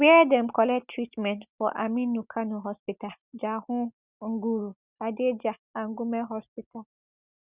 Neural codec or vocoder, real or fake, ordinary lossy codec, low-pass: none; real; Opus, 64 kbps; 3.6 kHz